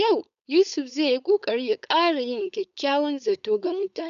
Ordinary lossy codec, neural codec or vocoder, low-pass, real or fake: MP3, 96 kbps; codec, 16 kHz, 4.8 kbps, FACodec; 7.2 kHz; fake